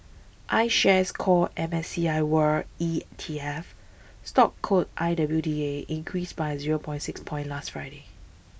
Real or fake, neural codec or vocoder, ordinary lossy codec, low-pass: real; none; none; none